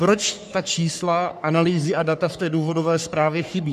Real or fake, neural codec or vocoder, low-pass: fake; codec, 44.1 kHz, 3.4 kbps, Pupu-Codec; 14.4 kHz